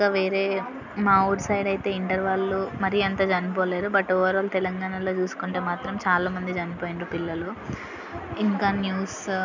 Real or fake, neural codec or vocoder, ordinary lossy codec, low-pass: real; none; none; 7.2 kHz